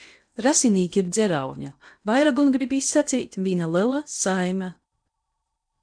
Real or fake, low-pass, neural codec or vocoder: fake; 9.9 kHz; codec, 16 kHz in and 24 kHz out, 0.8 kbps, FocalCodec, streaming, 65536 codes